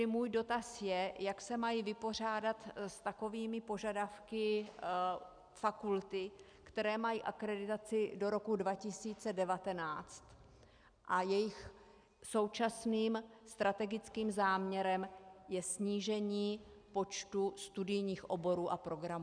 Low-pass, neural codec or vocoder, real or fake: 9.9 kHz; none; real